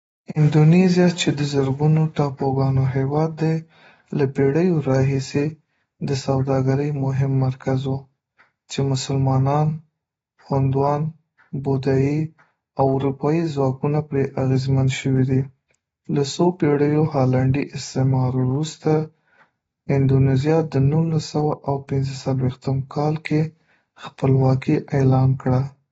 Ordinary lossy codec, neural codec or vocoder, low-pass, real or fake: AAC, 24 kbps; none; 19.8 kHz; real